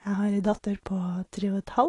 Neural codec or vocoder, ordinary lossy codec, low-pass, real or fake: none; AAC, 32 kbps; 10.8 kHz; real